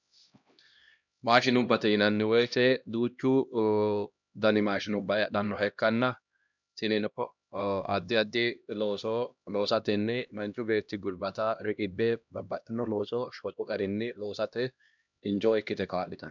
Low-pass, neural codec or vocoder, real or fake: 7.2 kHz; codec, 16 kHz, 1 kbps, X-Codec, HuBERT features, trained on LibriSpeech; fake